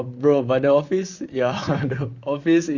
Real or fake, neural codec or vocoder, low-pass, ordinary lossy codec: fake; vocoder, 44.1 kHz, 128 mel bands, Pupu-Vocoder; 7.2 kHz; Opus, 64 kbps